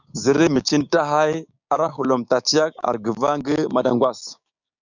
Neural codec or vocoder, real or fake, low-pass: codec, 16 kHz, 6 kbps, DAC; fake; 7.2 kHz